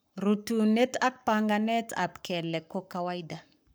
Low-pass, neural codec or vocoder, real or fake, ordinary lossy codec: none; codec, 44.1 kHz, 7.8 kbps, Pupu-Codec; fake; none